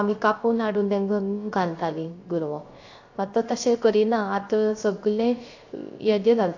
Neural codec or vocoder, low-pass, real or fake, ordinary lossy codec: codec, 16 kHz, 0.3 kbps, FocalCodec; 7.2 kHz; fake; AAC, 48 kbps